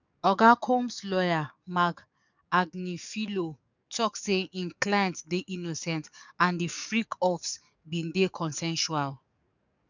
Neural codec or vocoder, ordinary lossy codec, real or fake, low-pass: codec, 44.1 kHz, 7.8 kbps, DAC; none; fake; 7.2 kHz